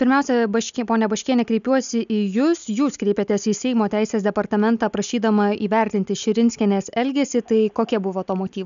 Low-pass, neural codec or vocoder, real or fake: 7.2 kHz; none; real